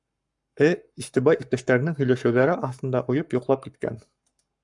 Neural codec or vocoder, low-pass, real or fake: codec, 44.1 kHz, 7.8 kbps, Pupu-Codec; 10.8 kHz; fake